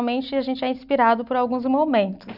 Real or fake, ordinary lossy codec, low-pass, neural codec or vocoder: real; none; 5.4 kHz; none